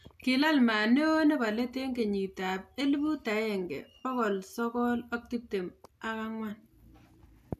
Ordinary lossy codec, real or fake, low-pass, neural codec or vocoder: none; real; 14.4 kHz; none